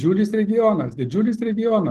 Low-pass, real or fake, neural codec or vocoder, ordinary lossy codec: 14.4 kHz; real; none; Opus, 16 kbps